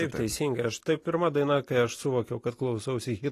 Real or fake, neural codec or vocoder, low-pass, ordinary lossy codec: real; none; 14.4 kHz; AAC, 48 kbps